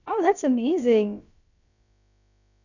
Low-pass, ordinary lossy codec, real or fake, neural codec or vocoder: 7.2 kHz; none; fake; codec, 16 kHz, about 1 kbps, DyCAST, with the encoder's durations